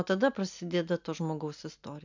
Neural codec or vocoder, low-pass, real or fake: none; 7.2 kHz; real